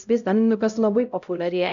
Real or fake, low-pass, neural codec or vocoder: fake; 7.2 kHz; codec, 16 kHz, 0.5 kbps, X-Codec, HuBERT features, trained on LibriSpeech